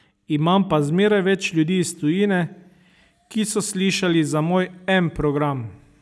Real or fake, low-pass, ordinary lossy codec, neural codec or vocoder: real; none; none; none